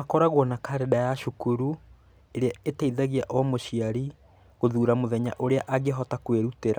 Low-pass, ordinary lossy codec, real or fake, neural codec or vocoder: none; none; real; none